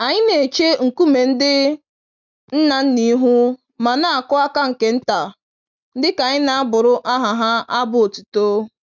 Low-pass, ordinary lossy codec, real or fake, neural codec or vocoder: 7.2 kHz; none; real; none